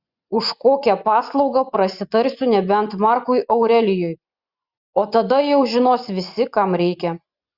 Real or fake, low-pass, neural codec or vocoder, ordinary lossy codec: fake; 5.4 kHz; vocoder, 24 kHz, 100 mel bands, Vocos; Opus, 64 kbps